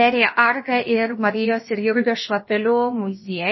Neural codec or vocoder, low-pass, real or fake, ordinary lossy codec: codec, 16 kHz, about 1 kbps, DyCAST, with the encoder's durations; 7.2 kHz; fake; MP3, 24 kbps